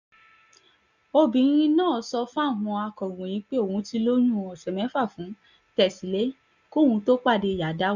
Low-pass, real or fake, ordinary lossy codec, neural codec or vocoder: 7.2 kHz; real; none; none